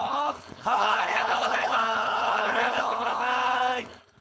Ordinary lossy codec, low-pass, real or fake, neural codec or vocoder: none; none; fake; codec, 16 kHz, 4.8 kbps, FACodec